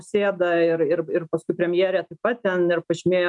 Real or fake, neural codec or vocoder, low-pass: real; none; 10.8 kHz